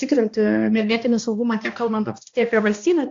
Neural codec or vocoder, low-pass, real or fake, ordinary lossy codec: codec, 16 kHz, 1 kbps, X-Codec, HuBERT features, trained on balanced general audio; 7.2 kHz; fake; AAC, 48 kbps